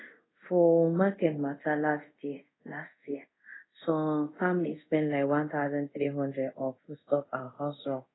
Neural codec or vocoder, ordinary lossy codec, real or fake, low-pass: codec, 24 kHz, 0.5 kbps, DualCodec; AAC, 16 kbps; fake; 7.2 kHz